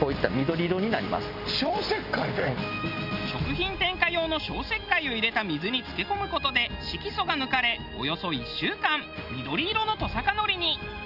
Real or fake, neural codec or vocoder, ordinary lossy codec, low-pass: real; none; none; 5.4 kHz